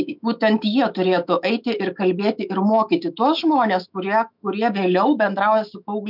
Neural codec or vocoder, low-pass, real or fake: autoencoder, 48 kHz, 128 numbers a frame, DAC-VAE, trained on Japanese speech; 5.4 kHz; fake